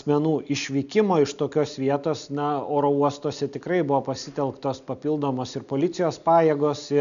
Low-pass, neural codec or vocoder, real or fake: 7.2 kHz; none; real